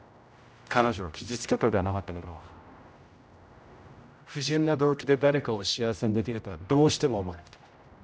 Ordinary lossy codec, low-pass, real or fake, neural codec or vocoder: none; none; fake; codec, 16 kHz, 0.5 kbps, X-Codec, HuBERT features, trained on general audio